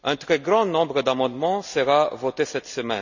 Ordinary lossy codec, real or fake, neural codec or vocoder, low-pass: none; real; none; 7.2 kHz